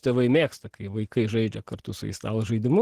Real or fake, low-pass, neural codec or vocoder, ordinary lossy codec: fake; 14.4 kHz; vocoder, 44.1 kHz, 128 mel bands every 512 samples, BigVGAN v2; Opus, 16 kbps